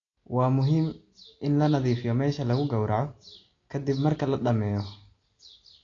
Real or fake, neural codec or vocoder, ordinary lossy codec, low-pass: real; none; AAC, 32 kbps; 7.2 kHz